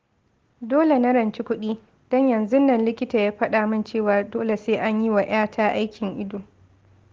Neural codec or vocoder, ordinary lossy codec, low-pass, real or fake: none; Opus, 16 kbps; 7.2 kHz; real